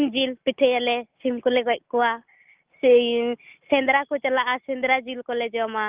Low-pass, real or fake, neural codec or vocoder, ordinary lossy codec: 3.6 kHz; real; none; Opus, 32 kbps